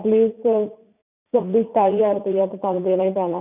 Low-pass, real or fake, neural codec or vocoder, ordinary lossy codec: 3.6 kHz; fake; vocoder, 44.1 kHz, 80 mel bands, Vocos; none